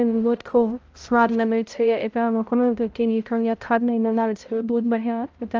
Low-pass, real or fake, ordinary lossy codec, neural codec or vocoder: 7.2 kHz; fake; Opus, 24 kbps; codec, 16 kHz, 0.5 kbps, X-Codec, HuBERT features, trained on balanced general audio